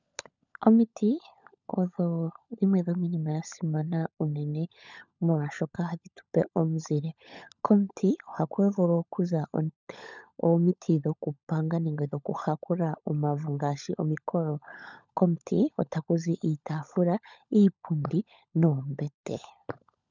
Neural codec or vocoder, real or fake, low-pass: codec, 16 kHz, 16 kbps, FunCodec, trained on LibriTTS, 50 frames a second; fake; 7.2 kHz